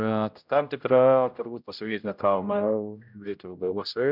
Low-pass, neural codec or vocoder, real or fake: 5.4 kHz; codec, 16 kHz, 0.5 kbps, X-Codec, HuBERT features, trained on general audio; fake